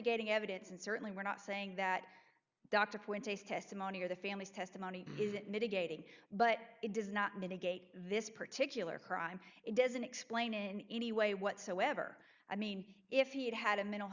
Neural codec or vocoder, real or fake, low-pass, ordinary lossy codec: none; real; 7.2 kHz; Opus, 64 kbps